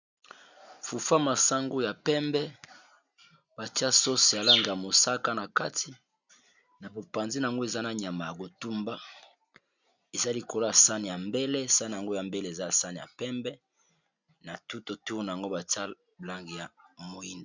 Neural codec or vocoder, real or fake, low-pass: none; real; 7.2 kHz